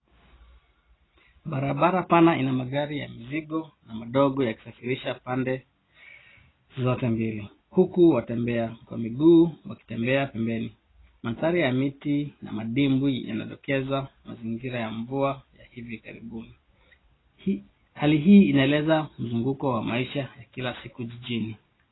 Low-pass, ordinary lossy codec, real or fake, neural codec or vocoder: 7.2 kHz; AAC, 16 kbps; real; none